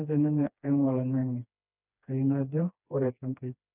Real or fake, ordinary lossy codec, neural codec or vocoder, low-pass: fake; none; codec, 16 kHz, 2 kbps, FreqCodec, smaller model; 3.6 kHz